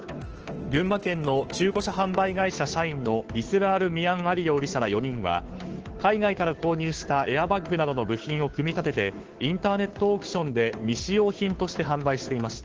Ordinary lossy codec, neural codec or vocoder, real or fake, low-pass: Opus, 16 kbps; codec, 16 kHz, 2 kbps, FunCodec, trained on Chinese and English, 25 frames a second; fake; 7.2 kHz